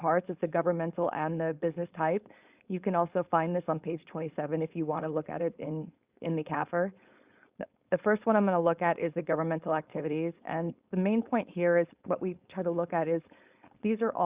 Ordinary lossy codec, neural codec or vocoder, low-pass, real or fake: Opus, 64 kbps; codec, 16 kHz, 4.8 kbps, FACodec; 3.6 kHz; fake